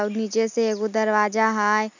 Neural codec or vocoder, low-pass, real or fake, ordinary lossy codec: none; 7.2 kHz; real; none